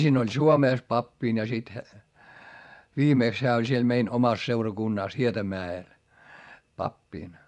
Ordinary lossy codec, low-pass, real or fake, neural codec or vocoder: none; 10.8 kHz; fake; vocoder, 24 kHz, 100 mel bands, Vocos